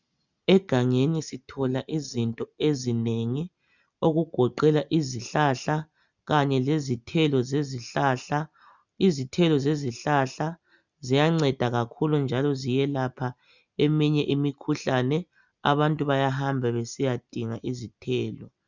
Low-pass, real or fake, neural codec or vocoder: 7.2 kHz; real; none